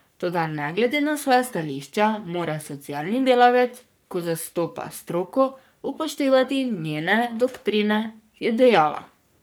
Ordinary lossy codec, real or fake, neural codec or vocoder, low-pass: none; fake; codec, 44.1 kHz, 3.4 kbps, Pupu-Codec; none